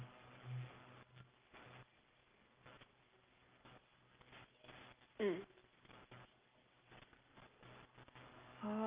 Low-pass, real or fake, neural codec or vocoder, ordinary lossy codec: 3.6 kHz; real; none; Opus, 24 kbps